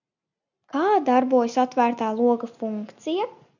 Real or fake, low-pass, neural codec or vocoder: real; 7.2 kHz; none